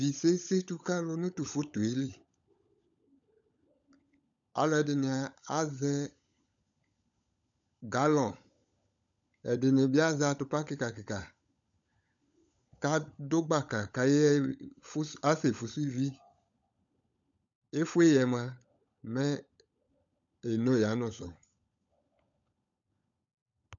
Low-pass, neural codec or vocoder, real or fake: 7.2 kHz; codec, 16 kHz, 16 kbps, FunCodec, trained on LibriTTS, 50 frames a second; fake